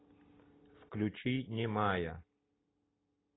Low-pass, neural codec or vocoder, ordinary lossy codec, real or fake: 7.2 kHz; none; AAC, 16 kbps; real